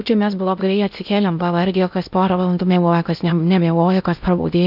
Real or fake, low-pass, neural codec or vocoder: fake; 5.4 kHz; codec, 16 kHz in and 24 kHz out, 0.6 kbps, FocalCodec, streaming, 2048 codes